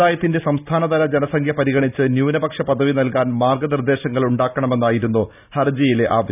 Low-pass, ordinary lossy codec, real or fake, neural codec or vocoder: 3.6 kHz; none; real; none